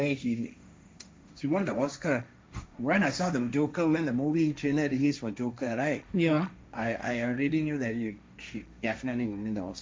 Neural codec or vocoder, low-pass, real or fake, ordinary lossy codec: codec, 16 kHz, 1.1 kbps, Voila-Tokenizer; none; fake; none